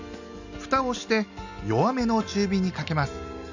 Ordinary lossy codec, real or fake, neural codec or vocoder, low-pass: none; real; none; 7.2 kHz